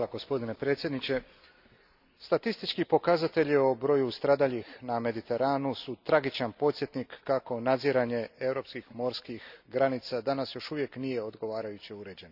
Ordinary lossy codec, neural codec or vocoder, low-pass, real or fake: none; none; 5.4 kHz; real